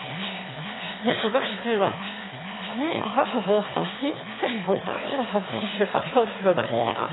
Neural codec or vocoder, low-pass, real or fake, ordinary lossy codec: autoencoder, 22.05 kHz, a latent of 192 numbers a frame, VITS, trained on one speaker; 7.2 kHz; fake; AAC, 16 kbps